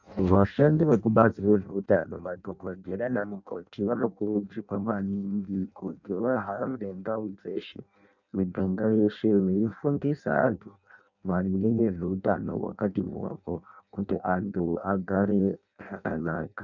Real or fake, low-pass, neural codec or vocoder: fake; 7.2 kHz; codec, 16 kHz in and 24 kHz out, 0.6 kbps, FireRedTTS-2 codec